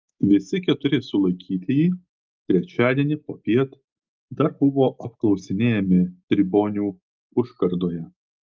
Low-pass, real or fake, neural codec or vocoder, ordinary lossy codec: 7.2 kHz; real; none; Opus, 24 kbps